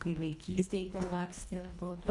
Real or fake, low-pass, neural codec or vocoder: fake; 10.8 kHz; codec, 24 kHz, 1.5 kbps, HILCodec